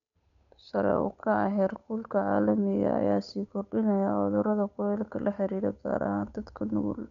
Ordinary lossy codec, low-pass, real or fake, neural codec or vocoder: none; 7.2 kHz; fake; codec, 16 kHz, 8 kbps, FunCodec, trained on Chinese and English, 25 frames a second